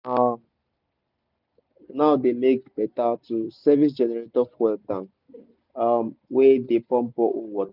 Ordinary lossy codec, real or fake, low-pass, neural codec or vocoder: none; real; 5.4 kHz; none